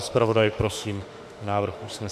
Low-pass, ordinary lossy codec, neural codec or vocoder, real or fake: 14.4 kHz; MP3, 96 kbps; autoencoder, 48 kHz, 32 numbers a frame, DAC-VAE, trained on Japanese speech; fake